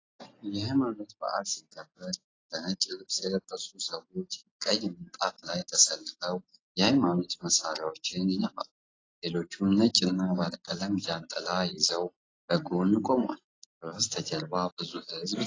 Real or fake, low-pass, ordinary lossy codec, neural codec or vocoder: real; 7.2 kHz; AAC, 32 kbps; none